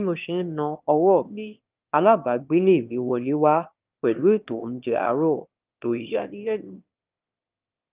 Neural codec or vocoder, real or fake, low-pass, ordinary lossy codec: autoencoder, 22.05 kHz, a latent of 192 numbers a frame, VITS, trained on one speaker; fake; 3.6 kHz; Opus, 24 kbps